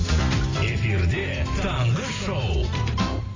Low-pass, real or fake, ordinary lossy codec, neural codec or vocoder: 7.2 kHz; fake; AAC, 32 kbps; vocoder, 44.1 kHz, 128 mel bands every 256 samples, BigVGAN v2